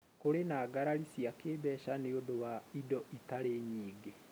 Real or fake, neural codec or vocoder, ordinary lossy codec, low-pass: real; none; none; none